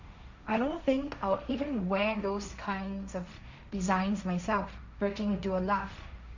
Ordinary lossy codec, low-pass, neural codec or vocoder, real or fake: none; 7.2 kHz; codec, 16 kHz, 1.1 kbps, Voila-Tokenizer; fake